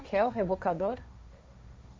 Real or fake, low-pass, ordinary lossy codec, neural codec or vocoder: fake; none; none; codec, 16 kHz, 1.1 kbps, Voila-Tokenizer